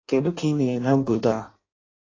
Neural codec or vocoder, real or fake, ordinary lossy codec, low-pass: codec, 16 kHz in and 24 kHz out, 0.6 kbps, FireRedTTS-2 codec; fake; AAC, 32 kbps; 7.2 kHz